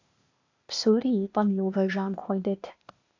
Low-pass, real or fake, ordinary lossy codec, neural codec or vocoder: 7.2 kHz; fake; AAC, 48 kbps; codec, 16 kHz, 0.8 kbps, ZipCodec